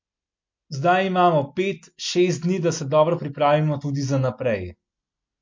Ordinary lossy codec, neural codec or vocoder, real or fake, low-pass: MP3, 48 kbps; none; real; 7.2 kHz